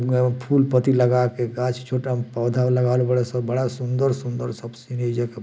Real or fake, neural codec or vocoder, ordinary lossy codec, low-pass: real; none; none; none